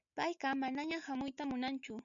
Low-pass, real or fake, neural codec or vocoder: 7.2 kHz; real; none